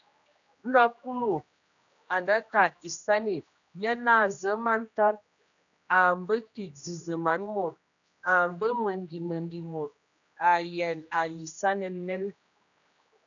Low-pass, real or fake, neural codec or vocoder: 7.2 kHz; fake; codec, 16 kHz, 1 kbps, X-Codec, HuBERT features, trained on general audio